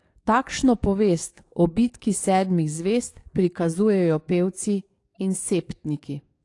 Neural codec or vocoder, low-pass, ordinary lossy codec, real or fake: codec, 44.1 kHz, 7.8 kbps, DAC; 10.8 kHz; AAC, 48 kbps; fake